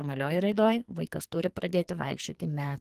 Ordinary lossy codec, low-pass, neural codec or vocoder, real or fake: Opus, 24 kbps; 14.4 kHz; codec, 44.1 kHz, 2.6 kbps, SNAC; fake